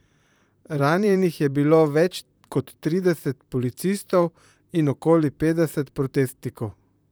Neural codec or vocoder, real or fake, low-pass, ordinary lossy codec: vocoder, 44.1 kHz, 128 mel bands, Pupu-Vocoder; fake; none; none